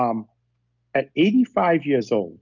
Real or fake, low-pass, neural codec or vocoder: real; 7.2 kHz; none